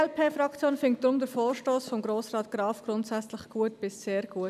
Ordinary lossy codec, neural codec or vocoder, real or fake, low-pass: none; none; real; 14.4 kHz